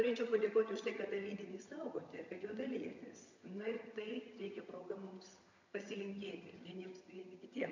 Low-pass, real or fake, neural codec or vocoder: 7.2 kHz; fake; vocoder, 22.05 kHz, 80 mel bands, HiFi-GAN